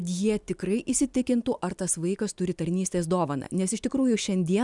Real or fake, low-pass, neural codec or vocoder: real; 10.8 kHz; none